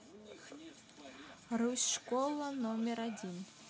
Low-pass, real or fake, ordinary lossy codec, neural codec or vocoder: none; real; none; none